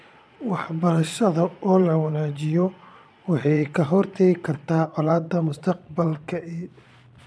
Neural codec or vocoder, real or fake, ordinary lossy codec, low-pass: vocoder, 22.05 kHz, 80 mel bands, Vocos; fake; none; none